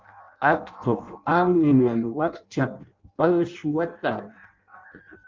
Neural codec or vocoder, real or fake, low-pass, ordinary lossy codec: codec, 16 kHz in and 24 kHz out, 0.6 kbps, FireRedTTS-2 codec; fake; 7.2 kHz; Opus, 24 kbps